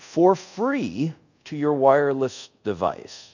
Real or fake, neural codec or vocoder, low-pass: fake; codec, 24 kHz, 0.5 kbps, DualCodec; 7.2 kHz